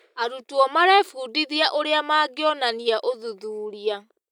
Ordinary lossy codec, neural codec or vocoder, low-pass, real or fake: none; none; 19.8 kHz; real